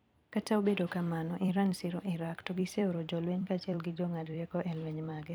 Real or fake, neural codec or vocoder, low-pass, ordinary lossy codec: fake; vocoder, 44.1 kHz, 128 mel bands every 256 samples, BigVGAN v2; none; none